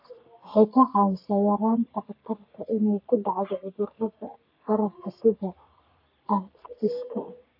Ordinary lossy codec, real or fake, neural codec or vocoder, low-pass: AAC, 48 kbps; fake; codec, 44.1 kHz, 3.4 kbps, Pupu-Codec; 5.4 kHz